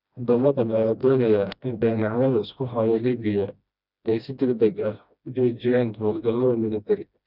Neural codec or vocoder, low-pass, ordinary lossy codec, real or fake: codec, 16 kHz, 1 kbps, FreqCodec, smaller model; 5.4 kHz; none; fake